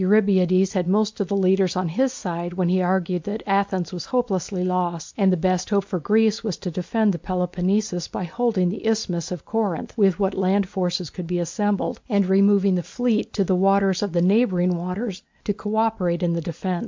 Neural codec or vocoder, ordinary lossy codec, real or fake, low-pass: none; MP3, 64 kbps; real; 7.2 kHz